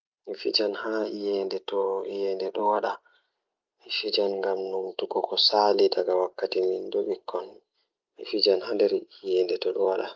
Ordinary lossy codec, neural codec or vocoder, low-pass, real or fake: Opus, 24 kbps; none; 7.2 kHz; real